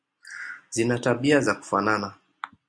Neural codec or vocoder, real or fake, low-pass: none; real; 9.9 kHz